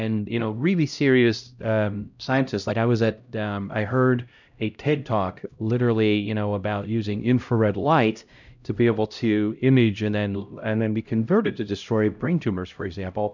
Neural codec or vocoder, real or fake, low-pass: codec, 16 kHz, 0.5 kbps, X-Codec, HuBERT features, trained on LibriSpeech; fake; 7.2 kHz